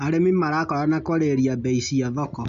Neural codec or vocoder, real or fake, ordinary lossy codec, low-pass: none; real; MP3, 48 kbps; 7.2 kHz